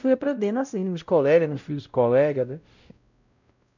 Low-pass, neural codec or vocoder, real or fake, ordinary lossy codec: 7.2 kHz; codec, 16 kHz, 0.5 kbps, X-Codec, WavLM features, trained on Multilingual LibriSpeech; fake; none